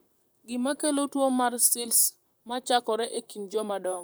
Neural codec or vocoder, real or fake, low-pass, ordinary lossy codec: vocoder, 44.1 kHz, 128 mel bands, Pupu-Vocoder; fake; none; none